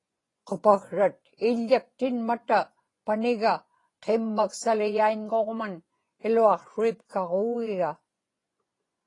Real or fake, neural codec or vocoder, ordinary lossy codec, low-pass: fake; vocoder, 24 kHz, 100 mel bands, Vocos; AAC, 32 kbps; 10.8 kHz